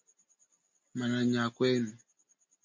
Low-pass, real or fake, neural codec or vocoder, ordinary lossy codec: 7.2 kHz; real; none; MP3, 48 kbps